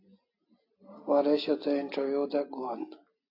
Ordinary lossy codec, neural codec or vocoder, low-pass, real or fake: AAC, 32 kbps; none; 5.4 kHz; real